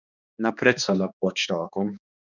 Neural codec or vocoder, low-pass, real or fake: codec, 16 kHz, 2 kbps, X-Codec, HuBERT features, trained on balanced general audio; 7.2 kHz; fake